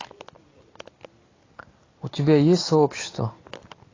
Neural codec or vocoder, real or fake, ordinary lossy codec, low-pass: none; real; AAC, 32 kbps; 7.2 kHz